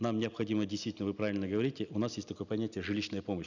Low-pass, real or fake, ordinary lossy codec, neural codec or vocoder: 7.2 kHz; real; none; none